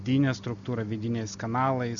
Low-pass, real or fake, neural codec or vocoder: 7.2 kHz; real; none